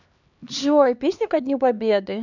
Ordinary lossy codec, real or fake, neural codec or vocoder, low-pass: none; fake; codec, 16 kHz, 2 kbps, X-Codec, HuBERT features, trained on LibriSpeech; 7.2 kHz